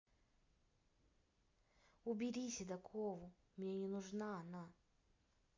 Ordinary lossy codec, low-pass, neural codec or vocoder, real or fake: MP3, 48 kbps; 7.2 kHz; none; real